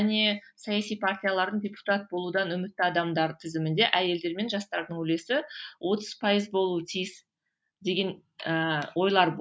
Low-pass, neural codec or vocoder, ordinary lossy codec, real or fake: none; none; none; real